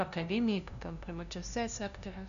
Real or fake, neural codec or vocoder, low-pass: fake; codec, 16 kHz, 0.5 kbps, FunCodec, trained on LibriTTS, 25 frames a second; 7.2 kHz